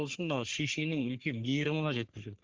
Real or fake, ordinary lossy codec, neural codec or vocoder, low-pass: fake; Opus, 16 kbps; codec, 44.1 kHz, 3.4 kbps, Pupu-Codec; 7.2 kHz